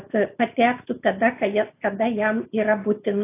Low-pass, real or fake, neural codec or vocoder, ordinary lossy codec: 3.6 kHz; real; none; AAC, 24 kbps